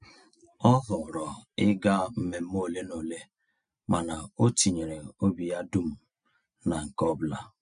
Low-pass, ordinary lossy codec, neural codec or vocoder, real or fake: 9.9 kHz; none; none; real